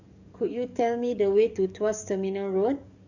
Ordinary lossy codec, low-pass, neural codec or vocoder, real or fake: none; 7.2 kHz; codec, 44.1 kHz, 7.8 kbps, DAC; fake